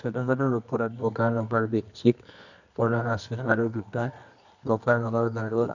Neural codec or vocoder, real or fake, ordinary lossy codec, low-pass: codec, 24 kHz, 0.9 kbps, WavTokenizer, medium music audio release; fake; none; 7.2 kHz